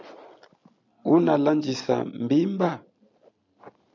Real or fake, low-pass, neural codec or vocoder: real; 7.2 kHz; none